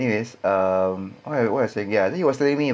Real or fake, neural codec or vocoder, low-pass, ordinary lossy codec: real; none; none; none